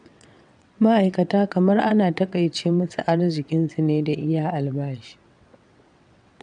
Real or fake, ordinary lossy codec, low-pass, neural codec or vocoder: fake; none; 9.9 kHz; vocoder, 22.05 kHz, 80 mel bands, WaveNeXt